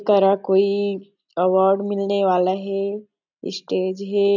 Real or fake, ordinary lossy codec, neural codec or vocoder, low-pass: real; none; none; 7.2 kHz